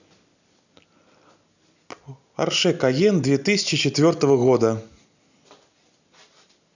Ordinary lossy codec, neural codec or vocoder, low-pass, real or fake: none; none; 7.2 kHz; real